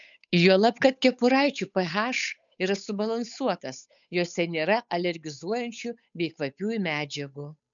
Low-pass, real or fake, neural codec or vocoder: 7.2 kHz; fake; codec, 16 kHz, 8 kbps, FunCodec, trained on Chinese and English, 25 frames a second